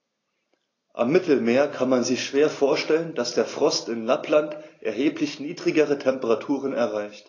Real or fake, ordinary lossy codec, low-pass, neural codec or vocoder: real; AAC, 32 kbps; 7.2 kHz; none